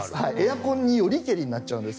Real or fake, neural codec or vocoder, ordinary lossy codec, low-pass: real; none; none; none